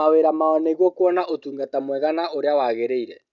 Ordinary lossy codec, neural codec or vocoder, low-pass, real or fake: none; none; 7.2 kHz; real